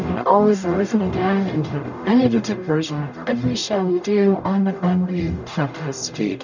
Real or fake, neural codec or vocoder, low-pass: fake; codec, 44.1 kHz, 0.9 kbps, DAC; 7.2 kHz